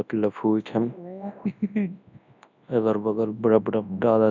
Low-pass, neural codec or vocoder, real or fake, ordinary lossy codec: 7.2 kHz; codec, 24 kHz, 0.9 kbps, WavTokenizer, large speech release; fake; none